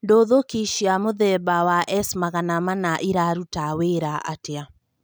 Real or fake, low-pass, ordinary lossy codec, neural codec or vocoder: real; none; none; none